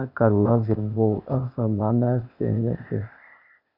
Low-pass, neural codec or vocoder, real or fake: 5.4 kHz; codec, 16 kHz, 0.8 kbps, ZipCodec; fake